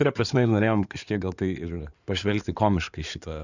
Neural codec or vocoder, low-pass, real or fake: codec, 16 kHz in and 24 kHz out, 2.2 kbps, FireRedTTS-2 codec; 7.2 kHz; fake